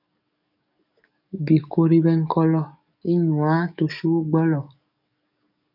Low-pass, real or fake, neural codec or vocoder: 5.4 kHz; fake; codec, 44.1 kHz, 7.8 kbps, DAC